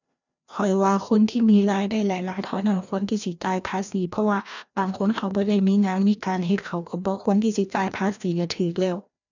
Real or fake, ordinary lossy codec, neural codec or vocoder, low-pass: fake; none; codec, 16 kHz, 1 kbps, FreqCodec, larger model; 7.2 kHz